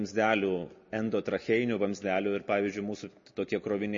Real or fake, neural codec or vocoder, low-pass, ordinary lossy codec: real; none; 7.2 kHz; MP3, 32 kbps